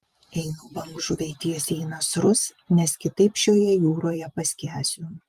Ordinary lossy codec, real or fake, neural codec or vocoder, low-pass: Opus, 32 kbps; fake; vocoder, 44.1 kHz, 128 mel bands every 512 samples, BigVGAN v2; 14.4 kHz